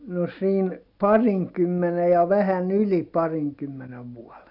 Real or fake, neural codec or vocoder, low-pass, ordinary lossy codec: real; none; 5.4 kHz; none